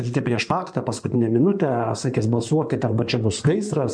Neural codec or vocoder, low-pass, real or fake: codec, 16 kHz in and 24 kHz out, 2.2 kbps, FireRedTTS-2 codec; 9.9 kHz; fake